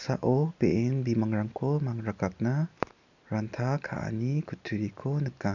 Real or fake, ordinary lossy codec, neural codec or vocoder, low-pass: real; none; none; 7.2 kHz